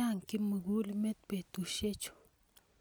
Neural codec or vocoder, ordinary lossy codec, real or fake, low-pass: none; none; real; none